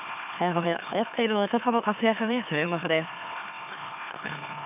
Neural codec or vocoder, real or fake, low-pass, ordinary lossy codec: autoencoder, 44.1 kHz, a latent of 192 numbers a frame, MeloTTS; fake; 3.6 kHz; none